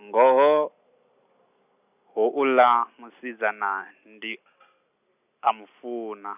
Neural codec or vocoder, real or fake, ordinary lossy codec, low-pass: none; real; none; 3.6 kHz